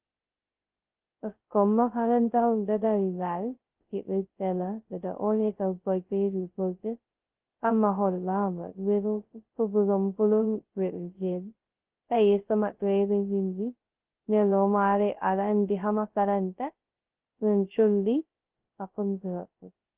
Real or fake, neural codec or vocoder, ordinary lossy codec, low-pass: fake; codec, 16 kHz, 0.2 kbps, FocalCodec; Opus, 16 kbps; 3.6 kHz